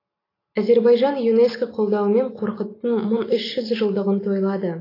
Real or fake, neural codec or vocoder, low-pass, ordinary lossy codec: real; none; 5.4 kHz; AAC, 24 kbps